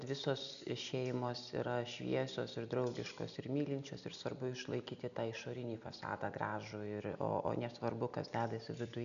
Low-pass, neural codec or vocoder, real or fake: 7.2 kHz; none; real